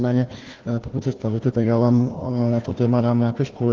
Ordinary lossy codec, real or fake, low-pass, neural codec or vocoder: Opus, 16 kbps; fake; 7.2 kHz; codec, 16 kHz, 1 kbps, FunCodec, trained on Chinese and English, 50 frames a second